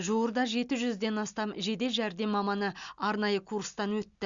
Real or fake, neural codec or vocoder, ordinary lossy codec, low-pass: real; none; Opus, 64 kbps; 7.2 kHz